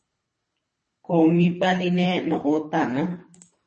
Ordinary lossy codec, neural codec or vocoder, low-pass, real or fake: MP3, 32 kbps; codec, 24 kHz, 3 kbps, HILCodec; 10.8 kHz; fake